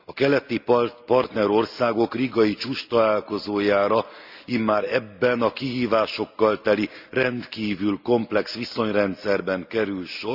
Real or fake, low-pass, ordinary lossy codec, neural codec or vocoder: real; 5.4 kHz; Opus, 64 kbps; none